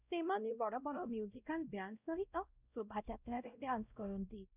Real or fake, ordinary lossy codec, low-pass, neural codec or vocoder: fake; none; 3.6 kHz; codec, 16 kHz, 0.5 kbps, X-Codec, WavLM features, trained on Multilingual LibriSpeech